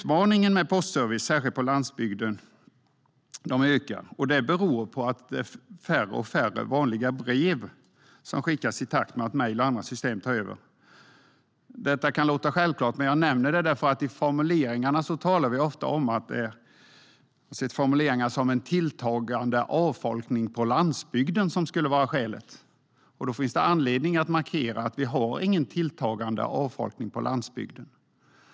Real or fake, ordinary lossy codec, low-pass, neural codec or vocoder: real; none; none; none